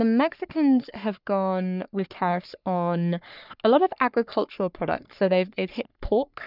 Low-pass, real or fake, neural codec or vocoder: 5.4 kHz; fake; codec, 44.1 kHz, 3.4 kbps, Pupu-Codec